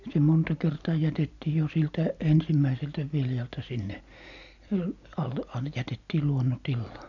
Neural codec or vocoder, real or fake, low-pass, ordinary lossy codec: none; real; 7.2 kHz; none